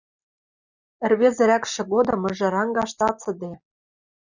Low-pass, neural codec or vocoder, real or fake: 7.2 kHz; none; real